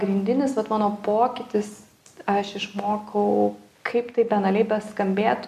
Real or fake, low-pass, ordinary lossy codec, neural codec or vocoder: real; 14.4 kHz; AAC, 96 kbps; none